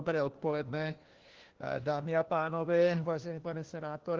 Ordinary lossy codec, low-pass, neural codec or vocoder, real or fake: Opus, 16 kbps; 7.2 kHz; codec, 16 kHz, 1 kbps, FunCodec, trained on LibriTTS, 50 frames a second; fake